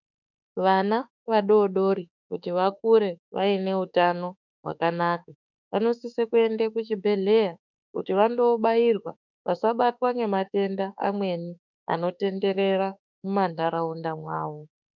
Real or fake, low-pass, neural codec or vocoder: fake; 7.2 kHz; autoencoder, 48 kHz, 32 numbers a frame, DAC-VAE, trained on Japanese speech